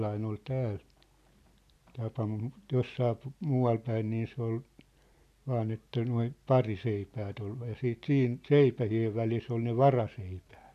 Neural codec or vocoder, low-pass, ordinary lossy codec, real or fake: none; 14.4 kHz; none; real